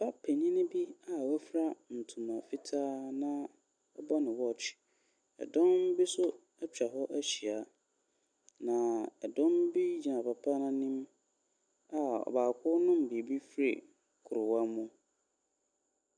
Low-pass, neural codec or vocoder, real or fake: 9.9 kHz; none; real